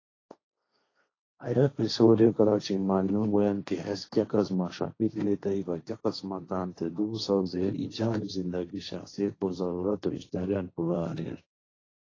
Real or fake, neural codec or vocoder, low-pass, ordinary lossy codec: fake; codec, 16 kHz, 1.1 kbps, Voila-Tokenizer; 7.2 kHz; AAC, 32 kbps